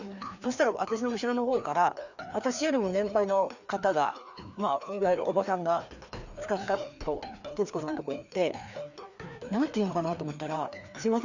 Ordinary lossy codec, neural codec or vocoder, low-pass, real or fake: none; codec, 16 kHz, 2 kbps, FreqCodec, larger model; 7.2 kHz; fake